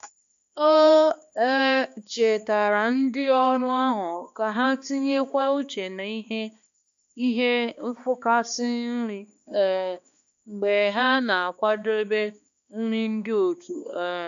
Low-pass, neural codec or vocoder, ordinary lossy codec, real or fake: 7.2 kHz; codec, 16 kHz, 2 kbps, X-Codec, HuBERT features, trained on balanced general audio; MP3, 64 kbps; fake